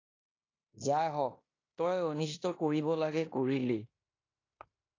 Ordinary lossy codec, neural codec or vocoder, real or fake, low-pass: AAC, 32 kbps; codec, 16 kHz in and 24 kHz out, 0.9 kbps, LongCat-Audio-Codec, fine tuned four codebook decoder; fake; 7.2 kHz